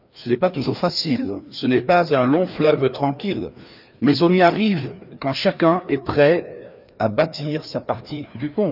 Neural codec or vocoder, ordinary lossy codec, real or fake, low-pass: codec, 16 kHz, 2 kbps, FreqCodec, larger model; none; fake; 5.4 kHz